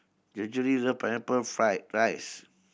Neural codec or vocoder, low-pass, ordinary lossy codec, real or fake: none; none; none; real